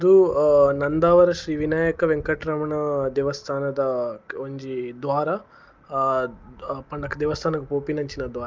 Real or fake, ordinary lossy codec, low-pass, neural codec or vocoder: real; Opus, 24 kbps; 7.2 kHz; none